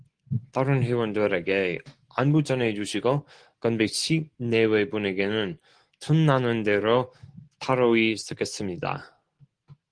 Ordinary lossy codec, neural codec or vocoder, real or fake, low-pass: Opus, 16 kbps; none; real; 9.9 kHz